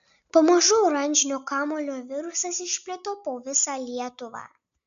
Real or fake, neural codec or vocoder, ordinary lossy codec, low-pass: real; none; AAC, 96 kbps; 7.2 kHz